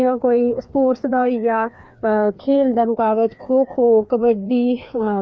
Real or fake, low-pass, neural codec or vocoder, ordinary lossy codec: fake; none; codec, 16 kHz, 2 kbps, FreqCodec, larger model; none